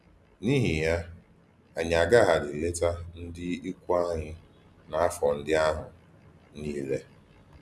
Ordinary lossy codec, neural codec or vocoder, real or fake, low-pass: none; none; real; none